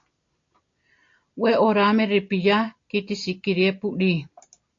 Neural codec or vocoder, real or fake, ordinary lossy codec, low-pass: none; real; AAC, 48 kbps; 7.2 kHz